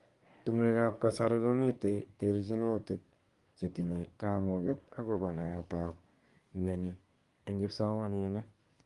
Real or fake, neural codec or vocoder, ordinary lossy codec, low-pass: fake; codec, 24 kHz, 1 kbps, SNAC; Opus, 32 kbps; 10.8 kHz